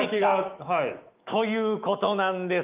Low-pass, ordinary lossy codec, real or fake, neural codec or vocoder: 3.6 kHz; Opus, 24 kbps; fake; autoencoder, 48 kHz, 128 numbers a frame, DAC-VAE, trained on Japanese speech